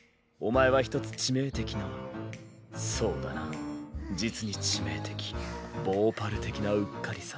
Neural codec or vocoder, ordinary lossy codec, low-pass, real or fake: none; none; none; real